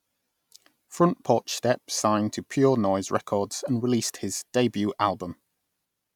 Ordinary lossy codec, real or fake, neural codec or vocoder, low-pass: none; real; none; 19.8 kHz